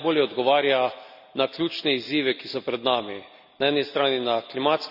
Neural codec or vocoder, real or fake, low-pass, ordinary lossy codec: none; real; 5.4 kHz; none